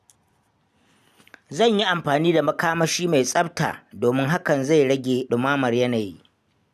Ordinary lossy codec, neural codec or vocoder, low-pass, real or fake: none; none; 14.4 kHz; real